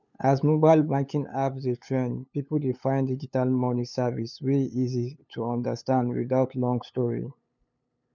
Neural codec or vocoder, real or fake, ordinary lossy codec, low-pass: codec, 16 kHz, 8 kbps, FunCodec, trained on LibriTTS, 25 frames a second; fake; none; 7.2 kHz